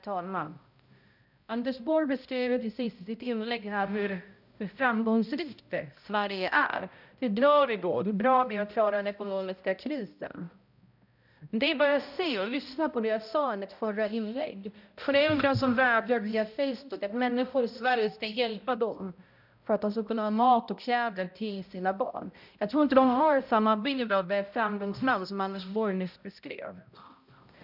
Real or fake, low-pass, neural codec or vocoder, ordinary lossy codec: fake; 5.4 kHz; codec, 16 kHz, 0.5 kbps, X-Codec, HuBERT features, trained on balanced general audio; none